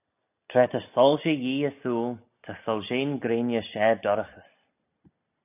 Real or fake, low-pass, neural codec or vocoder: real; 3.6 kHz; none